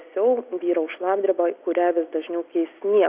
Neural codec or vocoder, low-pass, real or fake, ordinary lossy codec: none; 3.6 kHz; real; Opus, 64 kbps